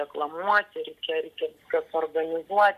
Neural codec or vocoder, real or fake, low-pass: none; real; 14.4 kHz